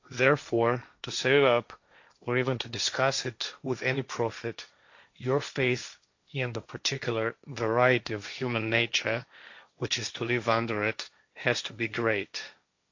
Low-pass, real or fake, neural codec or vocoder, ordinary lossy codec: 7.2 kHz; fake; codec, 16 kHz, 1.1 kbps, Voila-Tokenizer; AAC, 48 kbps